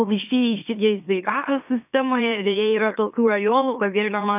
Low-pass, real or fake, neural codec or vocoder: 3.6 kHz; fake; autoencoder, 44.1 kHz, a latent of 192 numbers a frame, MeloTTS